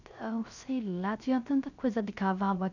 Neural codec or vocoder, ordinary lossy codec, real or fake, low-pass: codec, 16 kHz, 0.3 kbps, FocalCodec; none; fake; 7.2 kHz